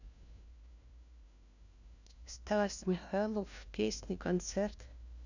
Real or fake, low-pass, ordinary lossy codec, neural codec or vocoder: fake; 7.2 kHz; none; codec, 16 kHz, 1 kbps, FunCodec, trained on LibriTTS, 50 frames a second